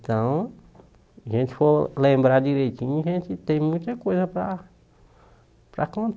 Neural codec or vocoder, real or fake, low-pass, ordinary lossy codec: none; real; none; none